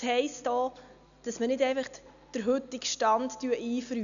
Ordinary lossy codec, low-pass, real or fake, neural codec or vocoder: none; 7.2 kHz; real; none